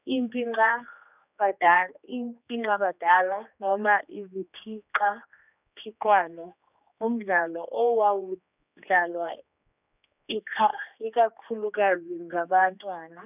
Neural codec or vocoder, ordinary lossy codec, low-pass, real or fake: codec, 16 kHz, 2 kbps, X-Codec, HuBERT features, trained on general audio; none; 3.6 kHz; fake